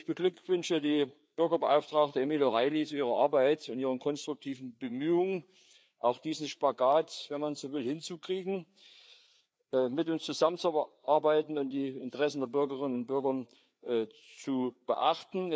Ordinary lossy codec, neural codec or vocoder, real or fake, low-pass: none; codec, 16 kHz, 4 kbps, FreqCodec, larger model; fake; none